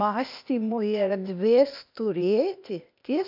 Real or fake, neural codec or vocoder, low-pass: fake; codec, 16 kHz, 0.8 kbps, ZipCodec; 5.4 kHz